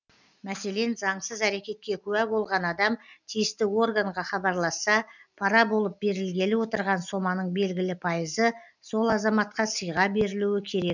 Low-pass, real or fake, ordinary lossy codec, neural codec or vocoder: 7.2 kHz; real; none; none